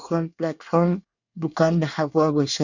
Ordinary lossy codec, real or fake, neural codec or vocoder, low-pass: none; fake; codec, 24 kHz, 1 kbps, SNAC; 7.2 kHz